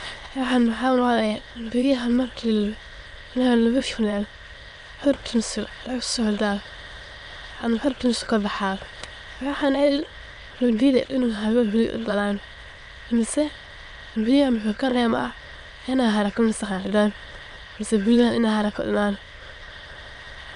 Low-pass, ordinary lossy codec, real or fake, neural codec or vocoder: 9.9 kHz; none; fake; autoencoder, 22.05 kHz, a latent of 192 numbers a frame, VITS, trained on many speakers